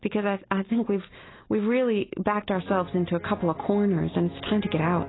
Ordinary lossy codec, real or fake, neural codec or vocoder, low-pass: AAC, 16 kbps; real; none; 7.2 kHz